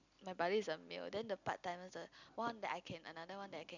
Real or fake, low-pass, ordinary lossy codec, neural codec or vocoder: real; 7.2 kHz; none; none